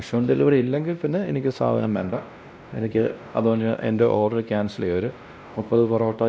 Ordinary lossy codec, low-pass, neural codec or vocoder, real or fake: none; none; codec, 16 kHz, 1 kbps, X-Codec, WavLM features, trained on Multilingual LibriSpeech; fake